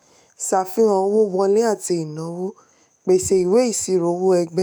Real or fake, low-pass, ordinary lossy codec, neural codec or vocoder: fake; none; none; autoencoder, 48 kHz, 128 numbers a frame, DAC-VAE, trained on Japanese speech